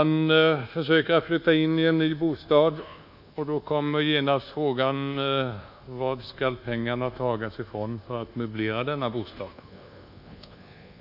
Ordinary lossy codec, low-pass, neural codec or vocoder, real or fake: none; 5.4 kHz; codec, 24 kHz, 1.2 kbps, DualCodec; fake